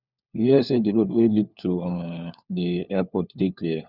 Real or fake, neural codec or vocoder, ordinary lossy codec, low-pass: fake; codec, 16 kHz, 4 kbps, FunCodec, trained on LibriTTS, 50 frames a second; Opus, 64 kbps; 5.4 kHz